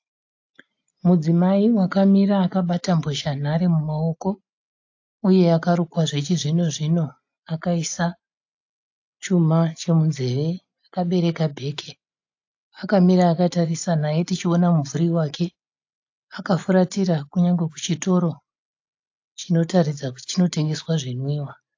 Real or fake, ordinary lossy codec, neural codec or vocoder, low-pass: real; AAC, 48 kbps; none; 7.2 kHz